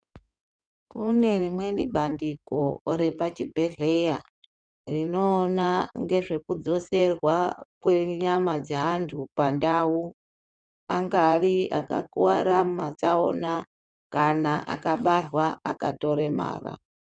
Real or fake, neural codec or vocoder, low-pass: fake; codec, 16 kHz in and 24 kHz out, 2.2 kbps, FireRedTTS-2 codec; 9.9 kHz